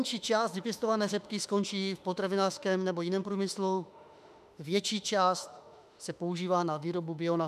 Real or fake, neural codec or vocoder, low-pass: fake; autoencoder, 48 kHz, 32 numbers a frame, DAC-VAE, trained on Japanese speech; 14.4 kHz